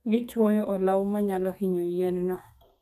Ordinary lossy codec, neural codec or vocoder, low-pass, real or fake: AAC, 96 kbps; codec, 32 kHz, 1.9 kbps, SNAC; 14.4 kHz; fake